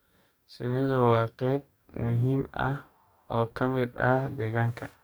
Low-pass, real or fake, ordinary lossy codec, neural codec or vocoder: none; fake; none; codec, 44.1 kHz, 2.6 kbps, DAC